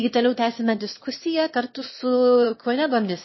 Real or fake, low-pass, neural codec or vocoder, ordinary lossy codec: fake; 7.2 kHz; autoencoder, 22.05 kHz, a latent of 192 numbers a frame, VITS, trained on one speaker; MP3, 24 kbps